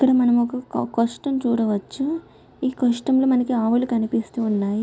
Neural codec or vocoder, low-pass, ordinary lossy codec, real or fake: none; none; none; real